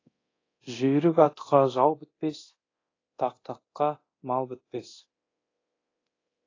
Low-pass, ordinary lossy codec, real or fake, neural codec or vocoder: 7.2 kHz; AAC, 32 kbps; fake; codec, 24 kHz, 0.9 kbps, DualCodec